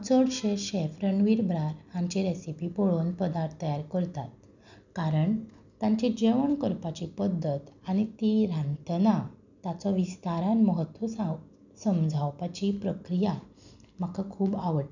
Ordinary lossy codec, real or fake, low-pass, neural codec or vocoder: none; real; 7.2 kHz; none